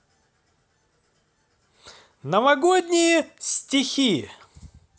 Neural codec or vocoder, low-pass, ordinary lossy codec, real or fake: none; none; none; real